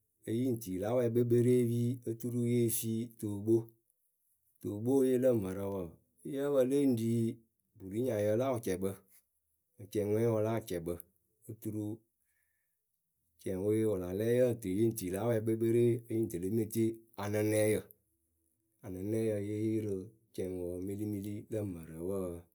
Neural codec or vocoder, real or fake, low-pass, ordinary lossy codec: none; real; none; none